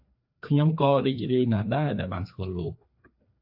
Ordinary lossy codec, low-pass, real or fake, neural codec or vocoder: AAC, 48 kbps; 5.4 kHz; fake; codec, 16 kHz, 2 kbps, FreqCodec, larger model